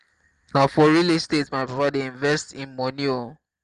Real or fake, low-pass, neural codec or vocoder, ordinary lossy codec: real; 10.8 kHz; none; Opus, 24 kbps